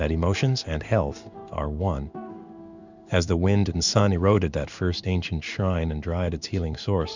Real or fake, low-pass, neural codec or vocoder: fake; 7.2 kHz; codec, 16 kHz in and 24 kHz out, 1 kbps, XY-Tokenizer